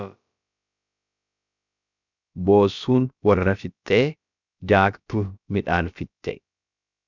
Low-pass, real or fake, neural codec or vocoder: 7.2 kHz; fake; codec, 16 kHz, about 1 kbps, DyCAST, with the encoder's durations